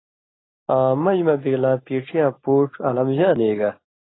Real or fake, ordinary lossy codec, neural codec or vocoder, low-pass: real; AAC, 16 kbps; none; 7.2 kHz